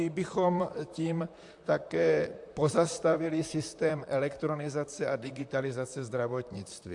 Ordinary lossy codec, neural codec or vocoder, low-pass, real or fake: AAC, 64 kbps; vocoder, 44.1 kHz, 128 mel bands, Pupu-Vocoder; 10.8 kHz; fake